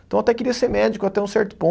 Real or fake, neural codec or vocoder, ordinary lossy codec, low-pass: real; none; none; none